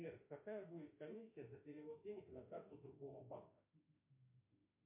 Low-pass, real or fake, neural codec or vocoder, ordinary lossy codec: 3.6 kHz; fake; autoencoder, 48 kHz, 32 numbers a frame, DAC-VAE, trained on Japanese speech; MP3, 24 kbps